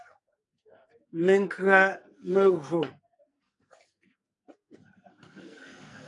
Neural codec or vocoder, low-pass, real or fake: codec, 44.1 kHz, 2.6 kbps, SNAC; 10.8 kHz; fake